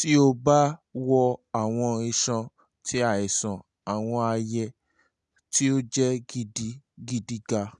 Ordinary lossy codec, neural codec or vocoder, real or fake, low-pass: none; none; real; 10.8 kHz